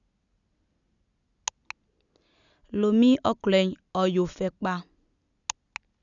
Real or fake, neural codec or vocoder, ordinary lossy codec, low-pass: real; none; none; 7.2 kHz